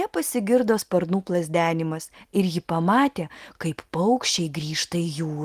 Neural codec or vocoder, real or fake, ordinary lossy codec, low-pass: none; real; Opus, 24 kbps; 14.4 kHz